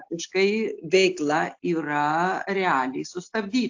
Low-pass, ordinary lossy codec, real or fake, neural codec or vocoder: 7.2 kHz; AAC, 48 kbps; real; none